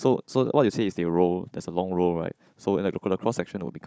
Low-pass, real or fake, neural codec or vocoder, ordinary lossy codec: none; fake; codec, 16 kHz, 16 kbps, FunCodec, trained on Chinese and English, 50 frames a second; none